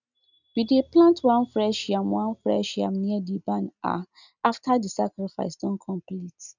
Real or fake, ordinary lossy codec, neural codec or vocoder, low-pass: real; none; none; 7.2 kHz